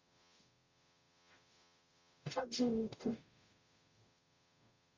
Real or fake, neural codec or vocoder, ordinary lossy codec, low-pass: fake; codec, 44.1 kHz, 0.9 kbps, DAC; MP3, 48 kbps; 7.2 kHz